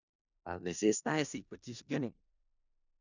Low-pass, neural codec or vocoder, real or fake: 7.2 kHz; codec, 16 kHz in and 24 kHz out, 0.4 kbps, LongCat-Audio-Codec, four codebook decoder; fake